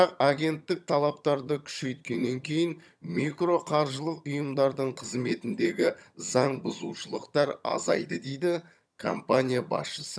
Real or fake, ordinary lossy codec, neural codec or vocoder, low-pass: fake; none; vocoder, 22.05 kHz, 80 mel bands, HiFi-GAN; none